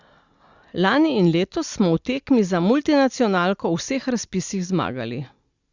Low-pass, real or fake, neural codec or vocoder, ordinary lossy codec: 7.2 kHz; real; none; Opus, 64 kbps